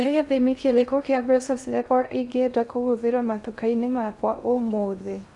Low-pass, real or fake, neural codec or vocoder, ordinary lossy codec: 10.8 kHz; fake; codec, 16 kHz in and 24 kHz out, 0.6 kbps, FocalCodec, streaming, 2048 codes; none